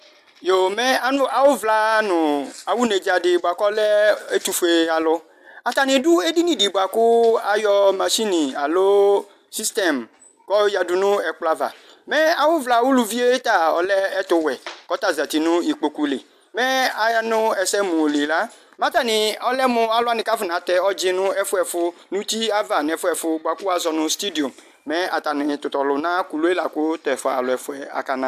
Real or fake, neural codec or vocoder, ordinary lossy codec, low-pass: real; none; AAC, 96 kbps; 14.4 kHz